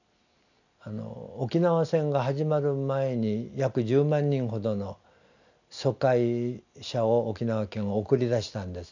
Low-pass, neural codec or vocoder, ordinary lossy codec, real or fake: 7.2 kHz; none; none; real